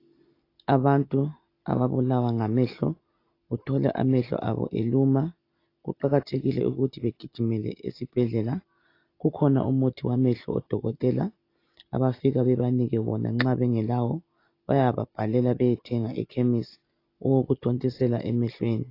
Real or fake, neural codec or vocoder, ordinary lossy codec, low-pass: real; none; AAC, 32 kbps; 5.4 kHz